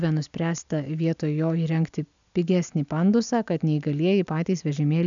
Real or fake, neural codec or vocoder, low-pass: real; none; 7.2 kHz